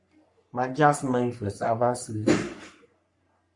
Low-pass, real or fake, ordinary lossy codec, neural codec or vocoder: 10.8 kHz; fake; MP3, 64 kbps; codec, 44.1 kHz, 3.4 kbps, Pupu-Codec